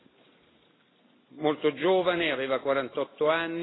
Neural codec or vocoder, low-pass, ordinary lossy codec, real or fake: none; 7.2 kHz; AAC, 16 kbps; real